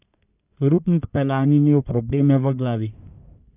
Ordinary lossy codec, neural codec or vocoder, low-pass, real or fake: none; codec, 44.1 kHz, 1.7 kbps, Pupu-Codec; 3.6 kHz; fake